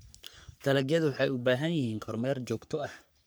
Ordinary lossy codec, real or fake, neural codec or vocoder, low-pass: none; fake; codec, 44.1 kHz, 3.4 kbps, Pupu-Codec; none